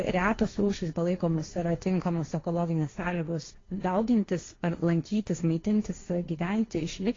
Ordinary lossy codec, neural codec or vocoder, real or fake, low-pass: AAC, 32 kbps; codec, 16 kHz, 1.1 kbps, Voila-Tokenizer; fake; 7.2 kHz